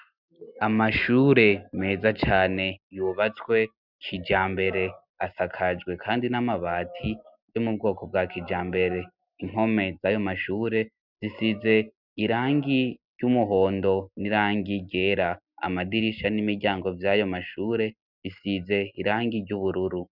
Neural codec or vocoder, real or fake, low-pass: none; real; 5.4 kHz